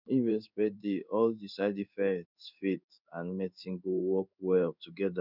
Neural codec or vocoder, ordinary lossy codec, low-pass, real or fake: codec, 16 kHz in and 24 kHz out, 1 kbps, XY-Tokenizer; none; 5.4 kHz; fake